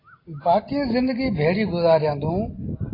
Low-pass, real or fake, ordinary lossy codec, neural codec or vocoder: 5.4 kHz; real; AAC, 24 kbps; none